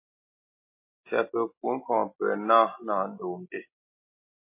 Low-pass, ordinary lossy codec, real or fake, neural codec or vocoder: 3.6 kHz; MP3, 24 kbps; real; none